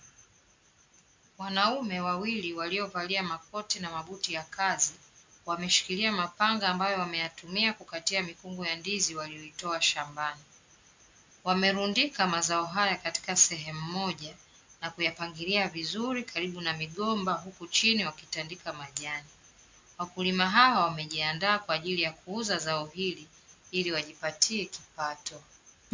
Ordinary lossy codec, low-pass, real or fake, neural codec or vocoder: MP3, 64 kbps; 7.2 kHz; real; none